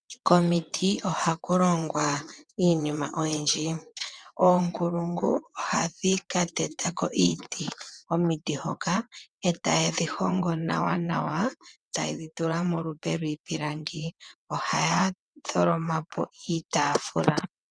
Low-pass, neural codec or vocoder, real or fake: 9.9 kHz; vocoder, 22.05 kHz, 80 mel bands, WaveNeXt; fake